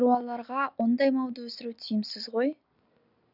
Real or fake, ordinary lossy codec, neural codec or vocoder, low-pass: real; none; none; 5.4 kHz